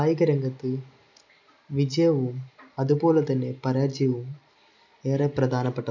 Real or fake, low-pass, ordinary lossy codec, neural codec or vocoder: real; 7.2 kHz; none; none